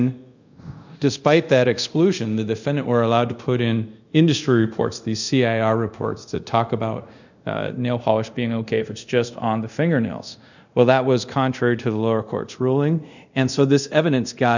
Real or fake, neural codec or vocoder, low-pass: fake; codec, 24 kHz, 0.5 kbps, DualCodec; 7.2 kHz